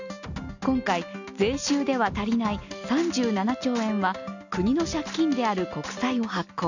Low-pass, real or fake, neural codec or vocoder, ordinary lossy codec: 7.2 kHz; real; none; none